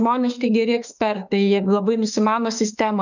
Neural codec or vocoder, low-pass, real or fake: autoencoder, 48 kHz, 32 numbers a frame, DAC-VAE, trained on Japanese speech; 7.2 kHz; fake